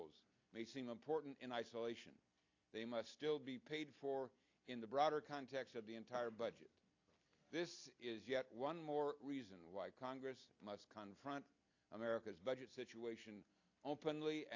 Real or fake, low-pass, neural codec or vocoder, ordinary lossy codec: real; 7.2 kHz; none; AAC, 48 kbps